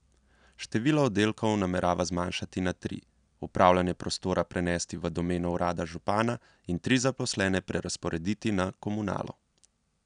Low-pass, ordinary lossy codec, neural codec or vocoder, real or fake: 9.9 kHz; none; none; real